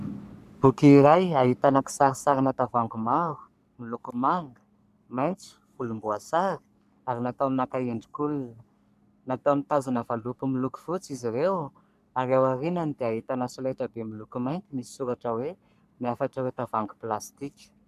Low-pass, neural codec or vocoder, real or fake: 14.4 kHz; codec, 44.1 kHz, 3.4 kbps, Pupu-Codec; fake